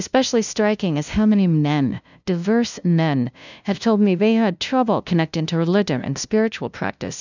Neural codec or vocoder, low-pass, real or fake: codec, 16 kHz, 0.5 kbps, FunCodec, trained on LibriTTS, 25 frames a second; 7.2 kHz; fake